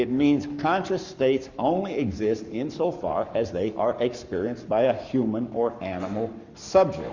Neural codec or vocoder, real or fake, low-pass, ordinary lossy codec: codec, 44.1 kHz, 7.8 kbps, Pupu-Codec; fake; 7.2 kHz; Opus, 64 kbps